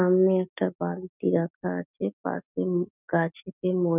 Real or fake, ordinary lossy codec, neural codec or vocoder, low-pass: real; none; none; 3.6 kHz